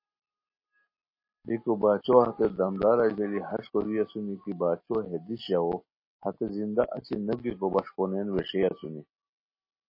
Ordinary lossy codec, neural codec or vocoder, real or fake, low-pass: MP3, 24 kbps; none; real; 5.4 kHz